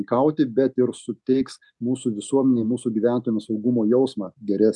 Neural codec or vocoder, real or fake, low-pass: none; real; 10.8 kHz